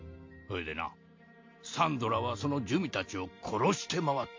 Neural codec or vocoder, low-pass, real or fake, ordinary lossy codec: none; 7.2 kHz; real; MP3, 48 kbps